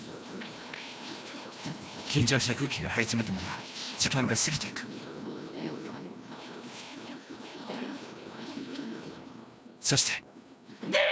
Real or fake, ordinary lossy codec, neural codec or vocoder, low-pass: fake; none; codec, 16 kHz, 1 kbps, FreqCodec, larger model; none